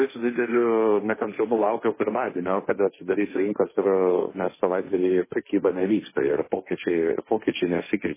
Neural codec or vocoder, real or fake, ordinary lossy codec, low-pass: codec, 16 kHz, 1.1 kbps, Voila-Tokenizer; fake; MP3, 16 kbps; 3.6 kHz